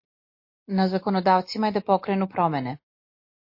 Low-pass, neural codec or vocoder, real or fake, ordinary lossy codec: 5.4 kHz; none; real; MP3, 32 kbps